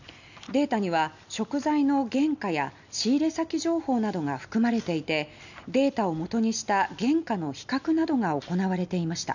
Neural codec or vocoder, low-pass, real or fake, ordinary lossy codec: none; 7.2 kHz; real; none